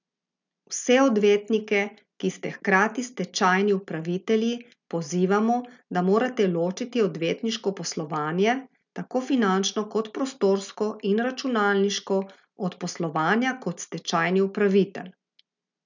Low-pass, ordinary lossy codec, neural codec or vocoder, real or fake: 7.2 kHz; none; none; real